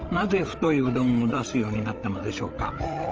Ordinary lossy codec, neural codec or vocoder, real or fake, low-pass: Opus, 24 kbps; codec, 16 kHz, 8 kbps, FreqCodec, larger model; fake; 7.2 kHz